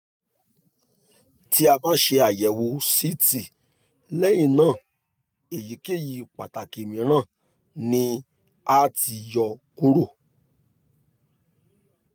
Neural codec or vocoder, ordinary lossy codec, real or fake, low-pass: none; none; real; none